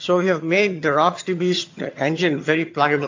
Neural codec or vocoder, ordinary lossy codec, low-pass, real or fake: vocoder, 22.05 kHz, 80 mel bands, HiFi-GAN; AAC, 48 kbps; 7.2 kHz; fake